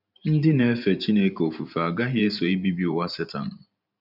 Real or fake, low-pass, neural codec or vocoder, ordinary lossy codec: fake; 5.4 kHz; vocoder, 44.1 kHz, 128 mel bands every 512 samples, BigVGAN v2; none